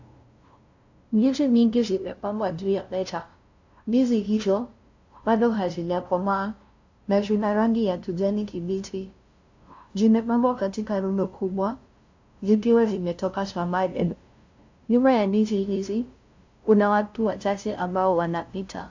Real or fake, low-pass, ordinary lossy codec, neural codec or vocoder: fake; 7.2 kHz; AAC, 48 kbps; codec, 16 kHz, 0.5 kbps, FunCodec, trained on LibriTTS, 25 frames a second